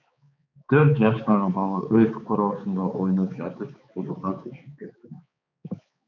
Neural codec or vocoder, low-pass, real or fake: codec, 16 kHz, 4 kbps, X-Codec, HuBERT features, trained on balanced general audio; 7.2 kHz; fake